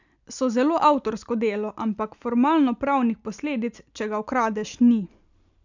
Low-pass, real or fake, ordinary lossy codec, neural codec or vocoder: 7.2 kHz; real; none; none